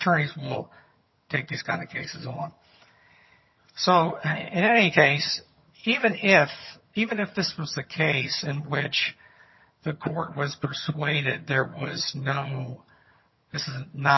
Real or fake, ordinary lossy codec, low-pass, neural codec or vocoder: fake; MP3, 24 kbps; 7.2 kHz; vocoder, 22.05 kHz, 80 mel bands, HiFi-GAN